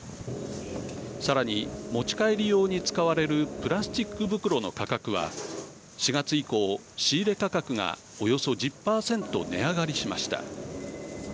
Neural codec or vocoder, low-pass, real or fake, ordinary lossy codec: none; none; real; none